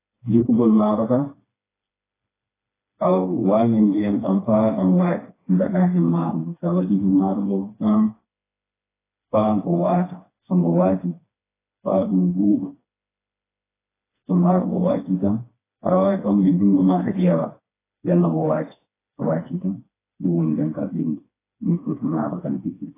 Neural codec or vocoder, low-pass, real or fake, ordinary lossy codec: codec, 16 kHz, 2 kbps, FreqCodec, smaller model; 3.6 kHz; fake; AAC, 16 kbps